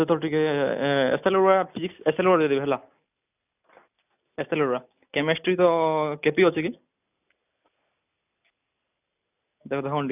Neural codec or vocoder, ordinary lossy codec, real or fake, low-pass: none; none; real; 3.6 kHz